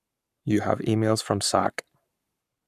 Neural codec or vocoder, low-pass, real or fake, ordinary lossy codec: codec, 44.1 kHz, 7.8 kbps, Pupu-Codec; 14.4 kHz; fake; none